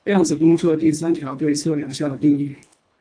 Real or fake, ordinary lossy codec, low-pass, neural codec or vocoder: fake; AAC, 64 kbps; 9.9 kHz; codec, 24 kHz, 1.5 kbps, HILCodec